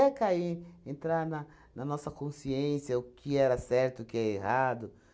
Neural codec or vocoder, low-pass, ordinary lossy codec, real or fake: none; none; none; real